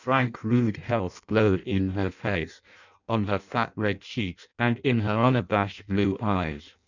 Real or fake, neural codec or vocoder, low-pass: fake; codec, 16 kHz in and 24 kHz out, 0.6 kbps, FireRedTTS-2 codec; 7.2 kHz